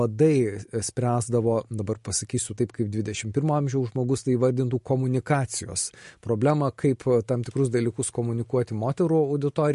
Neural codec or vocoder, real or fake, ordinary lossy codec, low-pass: none; real; MP3, 48 kbps; 14.4 kHz